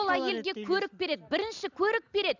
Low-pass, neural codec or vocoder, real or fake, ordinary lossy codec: 7.2 kHz; none; real; none